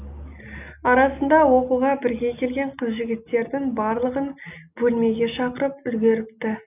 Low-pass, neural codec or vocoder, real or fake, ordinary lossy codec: 3.6 kHz; none; real; none